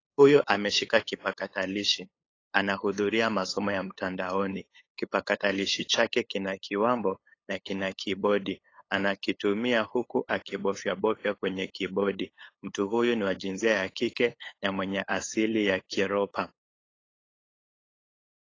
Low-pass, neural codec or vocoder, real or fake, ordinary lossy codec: 7.2 kHz; codec, 16 kHz, 8 kbps, FunCodec, trained on LibriTTS, 25 frames a second; fake; AAC, 32 kbps